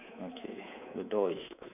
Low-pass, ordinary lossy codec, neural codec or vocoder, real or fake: 3.6 kHz; none; codec, 24 kHz, 3.1 kbps, DualCodec; fake